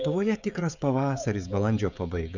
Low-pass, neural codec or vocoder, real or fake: 7.2 kHz; codec, 16 kHz, 16 kbps, FreqCodec, smaller model; fake